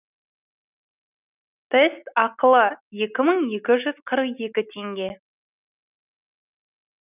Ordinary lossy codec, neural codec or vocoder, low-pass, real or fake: none; none; 3.6 kHz; real